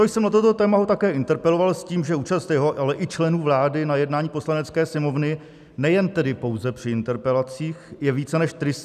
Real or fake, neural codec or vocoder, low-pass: real; none; 14.4 kHz